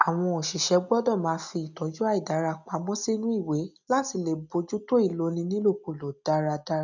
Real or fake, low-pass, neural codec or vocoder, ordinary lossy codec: real; 7.2 kHz; none; none